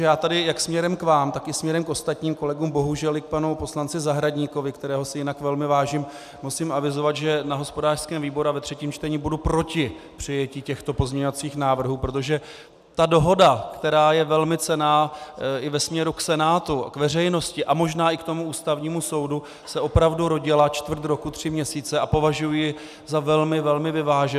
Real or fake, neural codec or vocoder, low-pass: real; none; 14.4 kHz